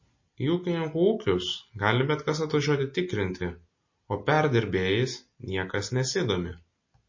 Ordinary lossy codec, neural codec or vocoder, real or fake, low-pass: MP3, 32 kbps; none; real; 7.2 kHz